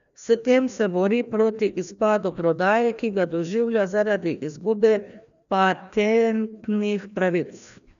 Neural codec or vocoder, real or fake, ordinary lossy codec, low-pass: codec, 16 kHz, 1 kbps, FreqCodec, larger model; fake; none; 7.2 kHz